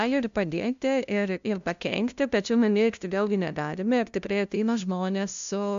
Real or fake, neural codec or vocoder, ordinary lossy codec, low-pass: fake; codec, 16 kHz, 0.5 kbps, FunCodec, trained on LibriTTS, 25 frames a second; AAC, 96 kbps; 7.2 kHz